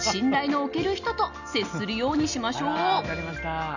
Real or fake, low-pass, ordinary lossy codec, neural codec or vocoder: real; 7.2 kHz; none; none